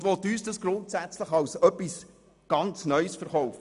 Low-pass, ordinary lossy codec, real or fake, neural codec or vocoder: 10.8 kHz; none; real; none